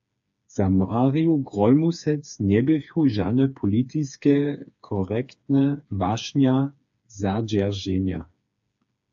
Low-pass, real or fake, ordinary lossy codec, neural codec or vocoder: 7.2 kHz; fake; AAC, 64 kbps; codec, 16 kHz, 4 kbps, FreqCodec, smaller model